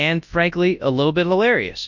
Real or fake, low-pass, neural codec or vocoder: fake; 7.2 kHz; codec, 24 kHz, 0.9 kbps, WavTokenizer, large speech release